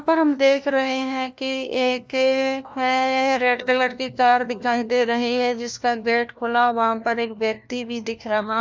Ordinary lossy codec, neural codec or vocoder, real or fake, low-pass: none; codec, 16 kHz, 1 kbps, FunCodec, trained on LibriTTS, 50 frames a second; fake; none